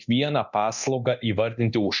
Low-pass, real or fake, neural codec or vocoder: 7.2 kHz; fake; codec, 24 kHz, 0.9 kbps, DualCodec